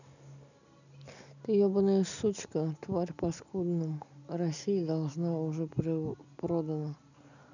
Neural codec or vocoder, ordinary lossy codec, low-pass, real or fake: none; none; 7.2 kHz; real